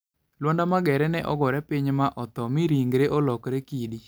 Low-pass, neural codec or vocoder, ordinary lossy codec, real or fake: none; none; none; real